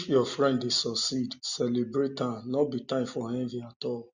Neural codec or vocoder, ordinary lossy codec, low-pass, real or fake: none; Opus, 64 kbps; 7.2 kHz; real